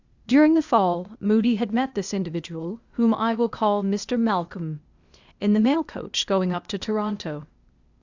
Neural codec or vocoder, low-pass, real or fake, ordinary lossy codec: codec, 16 kHz, 0.8 kbps, ZipCodec; 7.2 kHz; fake; Opus, 64 kbps